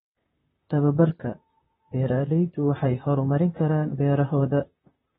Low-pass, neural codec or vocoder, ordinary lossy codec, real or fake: 19.8 kHz; vocoder, 44.1 kHz, 128 mel bands, Pupu-Vocoder; AAC, 16 kbps; fake